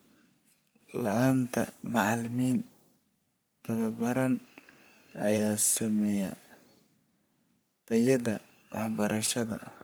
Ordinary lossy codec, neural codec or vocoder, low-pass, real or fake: none; codec, 44.1 kHz, 3.4 kbps, Pupu-Codec; none; fake